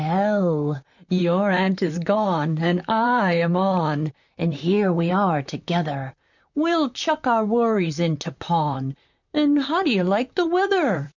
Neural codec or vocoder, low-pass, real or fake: vocoder, 44.1 kHz, 128 mel bands, Pupu-Vocoder; 7.2 kHz; fake